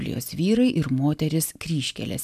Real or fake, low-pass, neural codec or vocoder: real; 14.4 kHz; none